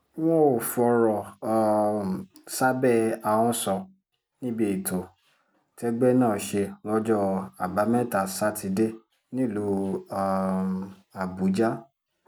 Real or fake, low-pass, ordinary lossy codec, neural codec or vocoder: real; none; none; none